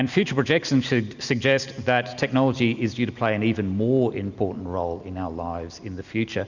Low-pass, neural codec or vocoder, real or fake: 7.2 kHz; none; real